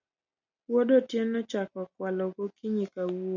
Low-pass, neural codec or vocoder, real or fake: 7.2 kHz; none; real